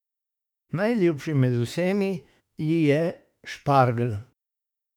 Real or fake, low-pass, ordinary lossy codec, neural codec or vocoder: fake; 19.8 kHz; none; autoencoder, 48 kHz, 32 numbers a frame, DAC-VAE, trained on Japanese speech